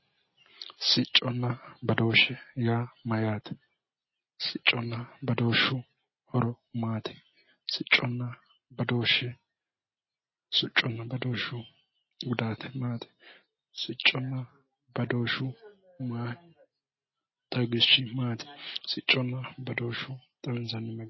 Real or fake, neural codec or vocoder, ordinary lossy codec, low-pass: real; none; MP3, 24 kbps; 7.2 kHz